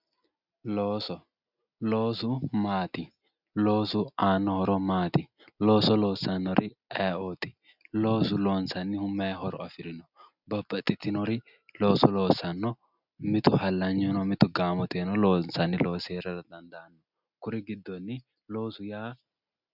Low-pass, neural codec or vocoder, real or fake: 5.4 kHz; none; real